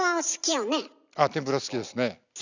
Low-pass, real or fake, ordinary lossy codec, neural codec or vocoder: 7.2 kHz; real; none; none